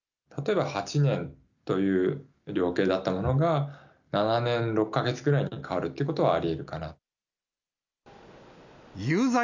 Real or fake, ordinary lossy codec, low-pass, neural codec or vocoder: real; none; 7.2 kHz; none